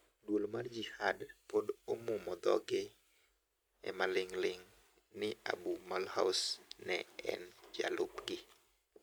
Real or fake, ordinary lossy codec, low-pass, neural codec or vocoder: real; none; none; none